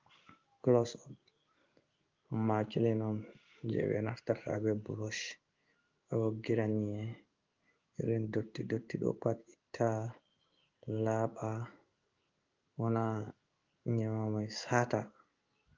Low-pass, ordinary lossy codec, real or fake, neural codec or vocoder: 7.2 kHz; Opus, 32 kbps; fake; codec, 16 kHz in and 24 kHz out, 1 kbps, XY-Tokenizer